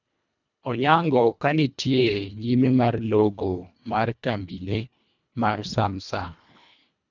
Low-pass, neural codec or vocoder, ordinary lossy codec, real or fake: 7.2 kHz; codec, 24 kHz, 1.5 kbps, HILCodec; none; fake